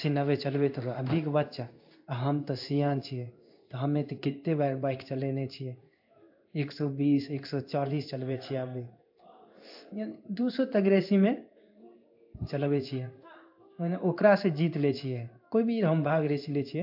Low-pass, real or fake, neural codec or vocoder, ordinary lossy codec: 5.4 kHz; fake; codec, 16 kHz in and 24 kHz out, 1 kbps, XY-Tokenizer; AAC, 48 kbps